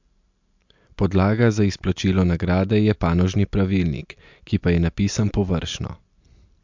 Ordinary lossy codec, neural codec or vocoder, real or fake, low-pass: MP3, 64 kbps; none; real; 7.2 kHz